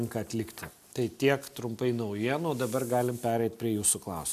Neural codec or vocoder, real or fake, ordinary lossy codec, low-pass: none; real; AAC, 96 kbps; 14.4 kHz